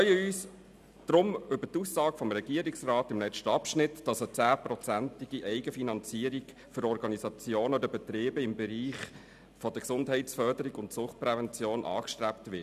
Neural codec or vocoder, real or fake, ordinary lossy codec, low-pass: none; real; none; 14.4 kHz